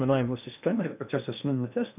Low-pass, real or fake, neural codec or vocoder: 3.6 kHz; fake; codec, 16 kHz in and 24 kHz out, 0.6 kbps, FocalCodec, streaming, 2048 codes